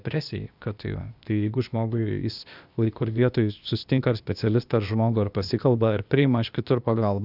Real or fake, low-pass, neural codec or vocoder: fake; 5.4 kHz; codec, 16 kHz, 0.8 kbps, ZipCodec